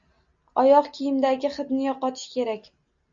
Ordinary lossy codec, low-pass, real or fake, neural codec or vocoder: Opus, 64 kbps; 7.2 kHz; real; none